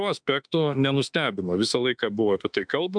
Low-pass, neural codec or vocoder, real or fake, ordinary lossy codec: 9.9 kHz; autoencoder, 48 kHz, 32 numbers a frame, DAC-VAE, trained on Japanese speech; fake; MP3, 96 kbps